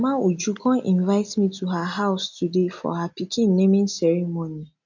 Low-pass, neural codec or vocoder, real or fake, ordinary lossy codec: 7.2 kHz; none; real; none